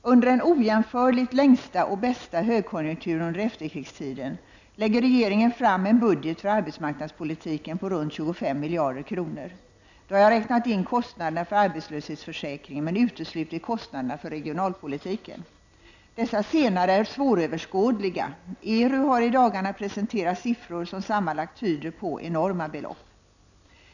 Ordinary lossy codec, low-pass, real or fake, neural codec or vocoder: none; 7.2 kHz; real; none